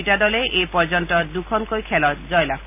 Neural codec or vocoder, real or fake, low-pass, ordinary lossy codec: none; real; 3.6 kHz; none